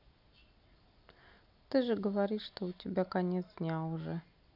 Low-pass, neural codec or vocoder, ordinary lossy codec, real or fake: 5.4 kHz; none; none; real